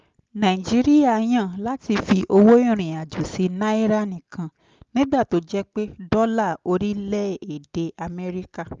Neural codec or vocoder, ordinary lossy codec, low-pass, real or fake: none; Opus, 32 kbps; 7.2 kHz; real